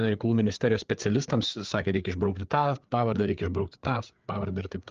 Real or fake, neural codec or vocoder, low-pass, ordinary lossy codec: fake; codec, 16 kHz, 4 kbps, FreqCodec, larger model; 7.2 kHz; Opus, 24 kbps